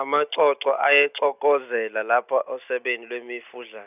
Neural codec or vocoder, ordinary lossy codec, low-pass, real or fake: none; none; 3.6 kHz; real